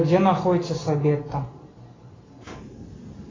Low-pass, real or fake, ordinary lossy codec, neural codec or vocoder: 7.2 kHz; real; AAC, 32 kbps; none